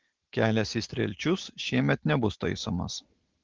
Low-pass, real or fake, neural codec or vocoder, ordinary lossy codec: 7.2 kHz; real; none; Opus, 16 kbps